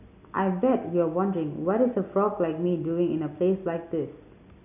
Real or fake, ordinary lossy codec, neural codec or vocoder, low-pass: real; none; none; 3.6 kHz